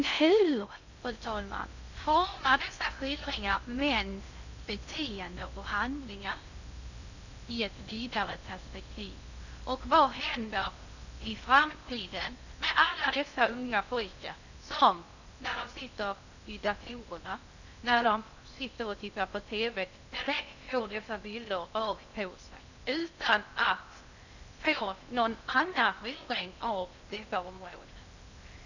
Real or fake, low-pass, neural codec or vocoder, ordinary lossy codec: fake; 7.2 kHz; codec, 16 kHz in and 24 kHz out, 0.6 kbps, FocalCodec, streaming, 2048 codes; none